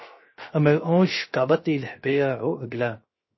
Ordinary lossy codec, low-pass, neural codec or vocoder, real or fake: MP3, 24 kbps; 7.2 kHz; codec, 16 kHz, 0.3 kbps, FocalCodec; fake